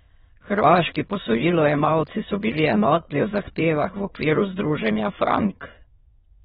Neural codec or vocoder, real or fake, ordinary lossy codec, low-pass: autoencoder, 22.05 kHz, a latent of 192 numbers a frame, VITS, trained on many speakers; fake; AAC, 16 kbps; 9.9 kHz